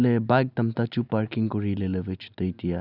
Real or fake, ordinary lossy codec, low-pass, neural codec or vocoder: real; none; 5.4 kHz; none